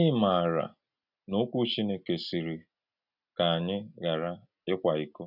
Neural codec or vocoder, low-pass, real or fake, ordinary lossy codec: none; 5.4 kHz; real; none